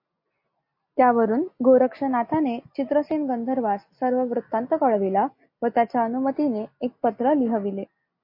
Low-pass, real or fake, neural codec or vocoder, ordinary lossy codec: 5.4 kHz; real; none; MP3, 32 kbps